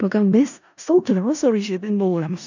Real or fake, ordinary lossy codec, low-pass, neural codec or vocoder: fake; none; 7.2 kHz; codec, 16 kHz in and 24 kHz out, 0.4 kbps, LongCat-Audio-Codec, four codebook decoder